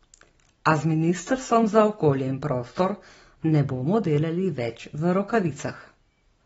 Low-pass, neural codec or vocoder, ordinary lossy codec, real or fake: 19.8 kHz; none; AAC, 24 kbps; real